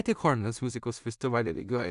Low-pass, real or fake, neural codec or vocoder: 10.8 kHz; fake; codec, 16 kHz in and 24 kHz out, 0.4 kbps, LongCat-Audio-Codec, two codebook decoder